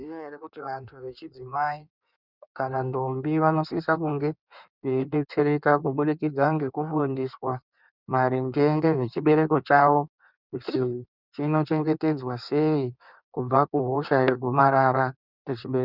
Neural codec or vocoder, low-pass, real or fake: codec, 16 kHz in and 24 kHz out, 1.1 kbps, FireRedTTS-2 codec; 5.4 kHz; fake